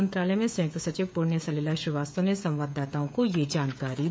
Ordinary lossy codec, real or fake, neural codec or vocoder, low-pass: none; fake; codec, 16 kHz, 16 kbps, FreqCodec, smaller model; none